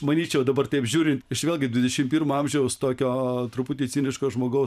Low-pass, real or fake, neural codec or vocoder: 14.4 kHz; fake; vocoder, 44.1 kHz, 128 mel bands every 512 samples, BigVGAN v2